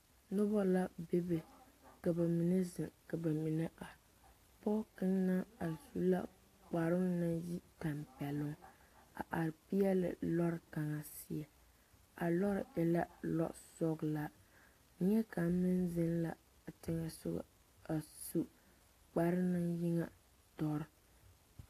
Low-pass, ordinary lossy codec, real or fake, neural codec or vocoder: 14.4 kHz; AAC, 48 kbps; real; none